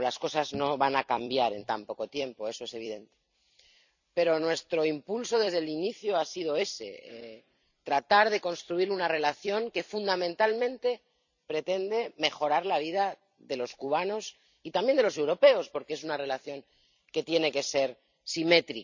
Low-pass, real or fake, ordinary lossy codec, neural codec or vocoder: 7.2 kHz; fake; none; vocoder, 44.1 kHz, 128 mel bands every 256 samples, BigVGAN v2